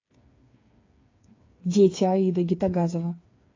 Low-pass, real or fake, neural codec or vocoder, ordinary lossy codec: 7.2 kHz; fake; codec, 16 kHz, 8 kbps, FreqCodec, smaller model; AAC, 32 kbps